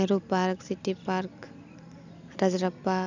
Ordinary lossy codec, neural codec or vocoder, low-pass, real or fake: none; none; 7.2 kHz; real